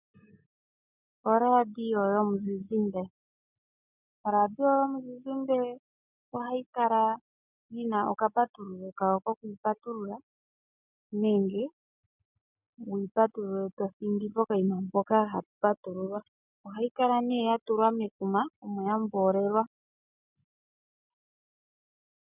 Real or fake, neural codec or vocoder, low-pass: real; none; 3.6 kHz